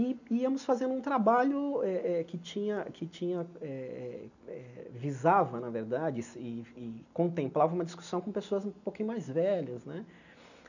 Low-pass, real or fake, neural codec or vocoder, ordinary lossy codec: 7.2 kHz; real; none; none